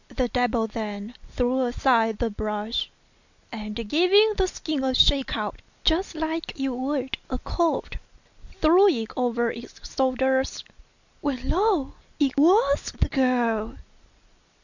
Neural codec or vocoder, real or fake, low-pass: none; real; 7.2 kHz